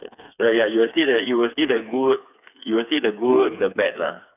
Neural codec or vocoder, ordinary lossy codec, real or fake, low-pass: codec, 16 kHz, 4 kbps, FreqCodec, smaller model; none; fake; 3.6 kHz